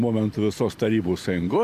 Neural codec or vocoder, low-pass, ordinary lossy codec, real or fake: vocoder, 44.1 kHz, 128 mel bands every 256 samples, BigVGAN v2; 14.4 kHz; Opus, 64 kbps; fake